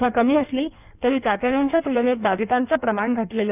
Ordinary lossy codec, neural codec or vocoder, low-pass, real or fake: none; codec, 16 kHz in and 24 kHz out, 1.1 kbps, FireRedTTS-2 codec; 3.6 kHz; fake